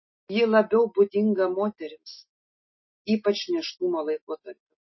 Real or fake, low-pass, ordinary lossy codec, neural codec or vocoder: real; 7.2 kHz; MP3, 24 kbps; none